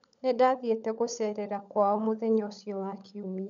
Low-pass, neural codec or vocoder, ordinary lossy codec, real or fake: 7.2 kHz; codec, 16 kHz, 16 kbps, FunCodec, trained on LibriTTS, 50 frames a second; none; fake